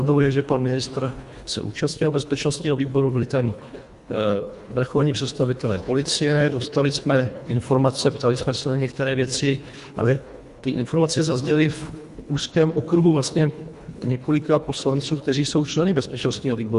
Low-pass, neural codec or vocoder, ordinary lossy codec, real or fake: 10.8 kHz; codec, 24 kHz, 1.5 kbps, HILCodec; Opus, 64 kbps; fake